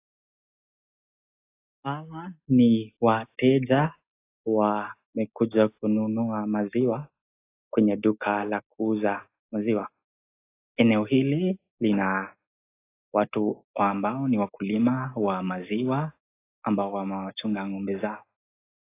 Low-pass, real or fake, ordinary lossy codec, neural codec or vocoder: 3.6 kHz; real; AAC, 24 kbps; none